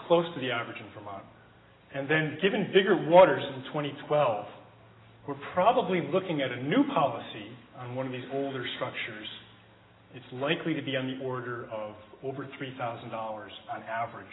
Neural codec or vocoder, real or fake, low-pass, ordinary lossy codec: none; real; 7.2 kHz; AAC, 16 kbps